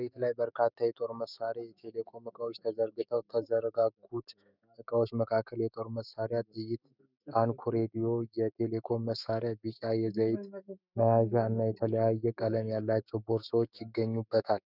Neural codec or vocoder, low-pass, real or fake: autoencoder, 48 kHz, 128 numbers a frame, DAC-VAE, trained on Japanese speech; 5.4 kHz; fake